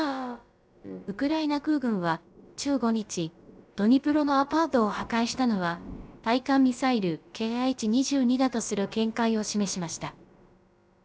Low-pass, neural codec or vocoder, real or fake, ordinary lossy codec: none; codec, 16 kHz, about 1 kbps, DyCAST, with the encoder's durations; fake; none